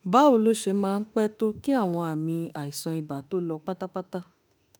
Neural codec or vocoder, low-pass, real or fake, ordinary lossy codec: autoencoder, 48 kHz, 32 numbers a frame, DAC-VAE, trained on Japanese speech; none; fake; none